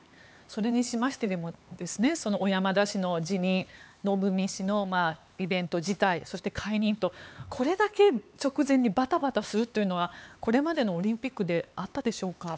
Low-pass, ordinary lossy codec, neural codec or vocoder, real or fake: none; none; codec, 16 kHz, 4 kbps, X-Codec, HuBERT features, trained on LibriSpeech; fake